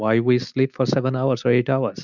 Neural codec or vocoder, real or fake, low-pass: none; real; 7.2 kHz